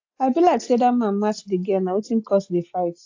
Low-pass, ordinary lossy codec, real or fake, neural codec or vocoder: 7.2 kHz; AAC, 48 kbps; real; none